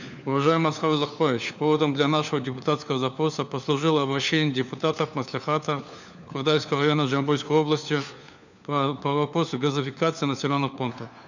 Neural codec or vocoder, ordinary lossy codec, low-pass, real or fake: codec, 16 kHz, 4 kbps, FunCodec, trained on LibriTTS, 50 frames a second; none; 7.2 kHz; fake